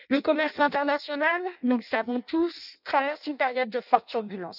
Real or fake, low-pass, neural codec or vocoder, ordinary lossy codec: fake; 5.4 kHz; codec, 16 kHz in and 24 kHz out, 0.6 kbps, FireRedTTS-2 codec; none